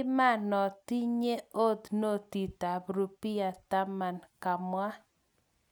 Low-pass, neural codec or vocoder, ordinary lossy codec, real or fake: none; none; none; real